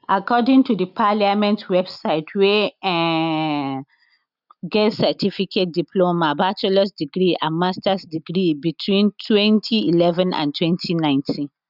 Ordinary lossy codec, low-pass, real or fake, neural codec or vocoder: none; 5.4 kHz; real; none